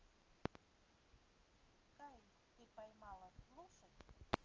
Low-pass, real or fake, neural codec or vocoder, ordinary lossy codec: 7.2 kHz; real; none; Opus, 24 kbps